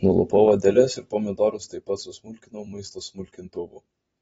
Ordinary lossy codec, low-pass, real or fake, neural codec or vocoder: AAC, 24 kbps; 19.8 kHz; fake; vocoder, 44.1 kHz, 128 mel bands every 256 samples, BigVGAN v2